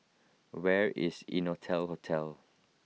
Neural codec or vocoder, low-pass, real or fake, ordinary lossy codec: none; none; real; none